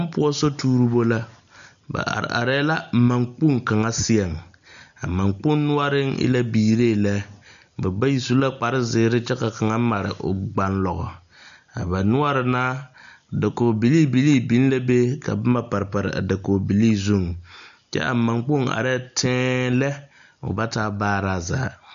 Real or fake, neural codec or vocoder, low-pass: real; none; 7.2 kHz